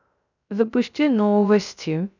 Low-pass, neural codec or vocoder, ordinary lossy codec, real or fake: 7.2 kHz; codec, 16 kHz, 0.3 kbps, FocalCodec; none; fake